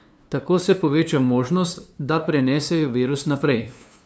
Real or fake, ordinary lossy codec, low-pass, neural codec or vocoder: fake; none; none; codec, 16 kHz, 2 kbps, FunCodec, trained on LibriTTS, 25 frames a second